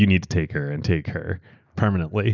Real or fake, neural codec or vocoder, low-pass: real; none; 7.2 kHz